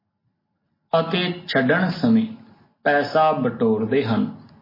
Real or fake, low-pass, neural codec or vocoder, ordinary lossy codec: real; 5.4 kHz; none; MP3, 24 kbps